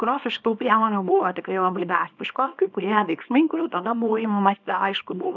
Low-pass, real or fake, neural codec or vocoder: 7.2 kHz; fake; codec, 24 kHz, 0.9 kbps, WavTokenizer, small release